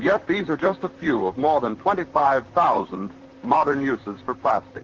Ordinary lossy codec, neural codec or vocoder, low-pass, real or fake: Opus, 16 kbps; vocoder, 44.1 kHz, 128 mel bands, Pupu-Vocoder; 7.2 kHz; fake